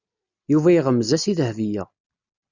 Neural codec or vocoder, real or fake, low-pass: none; real; 7.2 kHz